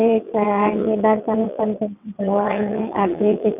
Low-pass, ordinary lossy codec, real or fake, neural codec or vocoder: 3.6 kHz; none; fake; vocoder, 22.05 kHz, 80 mel bands, WaveNeXt